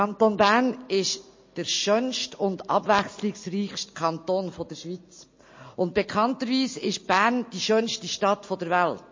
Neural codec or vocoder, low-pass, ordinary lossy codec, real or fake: none; 7.2 kHz; MP3, 32 kbps; real